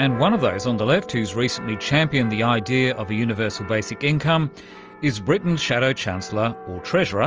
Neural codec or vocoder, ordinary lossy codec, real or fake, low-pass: none; Opus, 24 kbps; real; 7.2 kHz